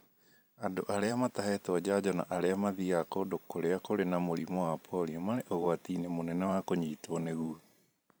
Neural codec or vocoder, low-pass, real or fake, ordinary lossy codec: vocoder, 44.1 kHz, 128 mel bands every 512 samples, BigVGAN v2; none; fake; none